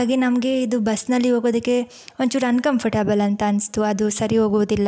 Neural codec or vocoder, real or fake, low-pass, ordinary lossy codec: none; real; none; none